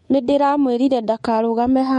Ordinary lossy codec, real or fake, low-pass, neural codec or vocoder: MP3, 48 kbps; fake; 10.8 kHz; codec, 24 kHz, 3.1 kbps, DualCodec